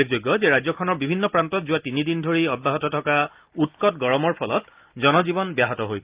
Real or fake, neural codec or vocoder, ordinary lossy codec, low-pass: real; none; Opus, 32 kbps; 3.6 kHz